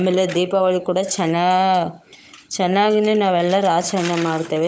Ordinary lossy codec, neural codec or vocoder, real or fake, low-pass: none; codec, 16 kHz, 16 kbps, FunCodec, trained on Chinese and English, 50 frames a second; fake; none